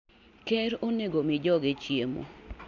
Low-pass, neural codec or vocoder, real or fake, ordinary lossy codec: 7.2 kHz; none; real; none